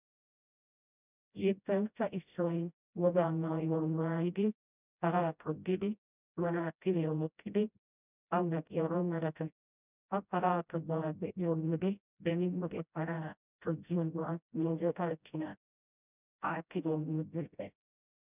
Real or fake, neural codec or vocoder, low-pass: fake; codec, 16 kHz, 0.5 kbps, FreqCodec, smaller model; 3.6 kHz